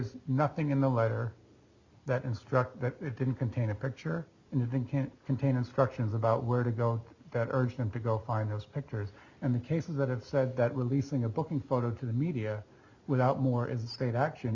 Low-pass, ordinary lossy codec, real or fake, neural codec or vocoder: 7.2 kHz; Opus, 64 kbps; real; none